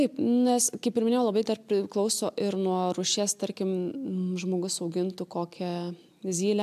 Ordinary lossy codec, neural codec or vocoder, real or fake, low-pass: AAC, 96 kbps; none; real; 14.4 kHz